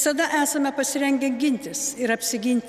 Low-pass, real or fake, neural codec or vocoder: 14.4 kHz; real; none